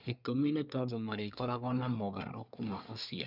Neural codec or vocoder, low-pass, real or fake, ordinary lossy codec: codec, 24 kHz, 1 kbps, SNAC; 5.4 kHz; fake; none